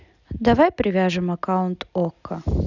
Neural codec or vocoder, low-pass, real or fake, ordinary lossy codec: none; 7.2 kHz; real; none